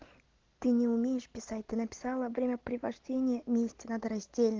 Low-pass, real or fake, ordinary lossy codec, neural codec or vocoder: 7.2 kHz; real; Opus, 16 kbps; none